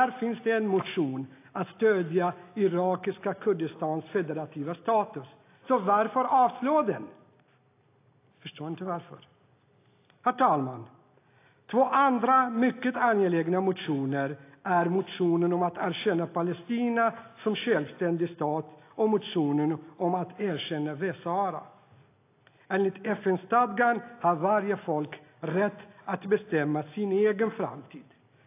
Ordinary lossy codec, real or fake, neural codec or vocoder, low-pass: AAC, 24 kbps; real; none; 3.6 kHz